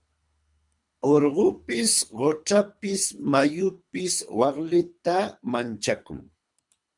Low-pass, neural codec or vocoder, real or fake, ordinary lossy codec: 10.8 kHz; codec, 24 kHz, 3 kbps, HILCodec; fake; MP3, 96 kbps